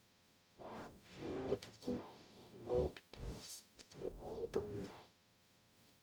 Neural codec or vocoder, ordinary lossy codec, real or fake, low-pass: codec, 44.1 kHz, 0.9 kbps, DAC; none; fake; none